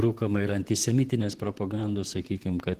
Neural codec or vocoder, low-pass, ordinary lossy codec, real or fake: vocoder, 44.1 kHz, 128 mel bands, Pupu-Vocoder; 14.4 kHz; Opus, 16 kbps; fake